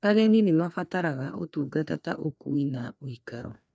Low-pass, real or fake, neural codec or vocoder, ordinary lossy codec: none; fake; codec, 16 kHz, 2 kbps, FreqCodec, larger model; none